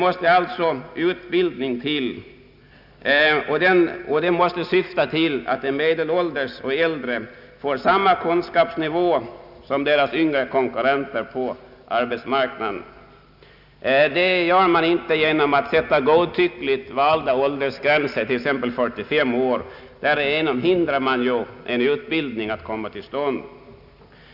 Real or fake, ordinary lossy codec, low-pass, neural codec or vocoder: real; none; 5.4 kHz; none